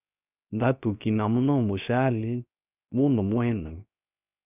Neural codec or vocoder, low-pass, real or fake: codec, 16 kHz, 0.3 kbps, FocalCodec; 3.6 kHz; fake